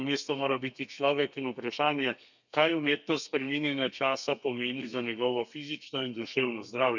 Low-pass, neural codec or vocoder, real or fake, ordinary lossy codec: 7.2 kHz; codec, 32 kHz, 1.9 kbps, SNAC; fake; none